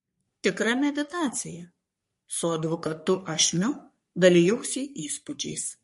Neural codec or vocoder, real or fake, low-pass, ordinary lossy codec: codec, 44.1 kHz, 3.4 kbps, Pupu-Codec; fake; 14.4 kHz; MP3, 48 kbps